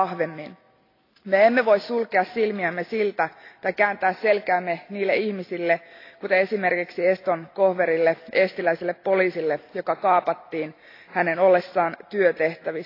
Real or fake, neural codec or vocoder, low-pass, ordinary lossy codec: real; none; 5.4 kHz; AAC, 32 kbps